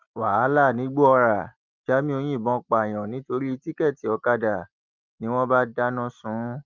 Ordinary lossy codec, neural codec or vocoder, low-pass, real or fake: Opus, 24 kbps; none; 7.2 kHz; real